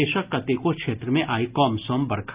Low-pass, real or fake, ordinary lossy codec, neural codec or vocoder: 3.6 kHz; real; Opus, 24 kbps; none